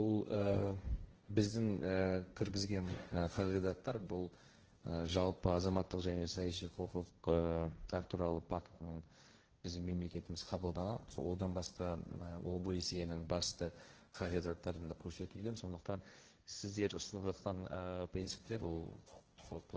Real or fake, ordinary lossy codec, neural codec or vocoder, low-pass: fake; Opus, 16 kbps; codec, 16 kHz, 1.1 kbps, Voila-Tokenizer; 7.2 kHz